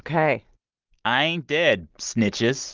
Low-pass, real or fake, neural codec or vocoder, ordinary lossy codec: 7.2 kHz; real; none; Opus, 32 kbps